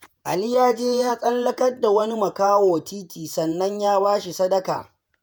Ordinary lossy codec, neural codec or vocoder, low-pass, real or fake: none; vocoder, 48 kHz, 128 mel bands, Vocos; none; fake